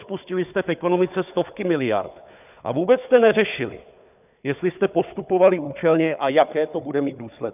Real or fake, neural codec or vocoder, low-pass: fake; codec, 16 kHz, 4 kbps, FunCodec, trained on Chinese and English, 50 frames a second; 3.6 kHz